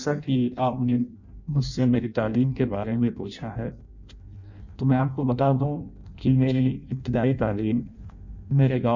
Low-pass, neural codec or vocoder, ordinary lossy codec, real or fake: 7.2 kHz; codec, 16 kHz in and 24 kHz out, 0.6 kbps, FireRedTTS-2 codec; none; fake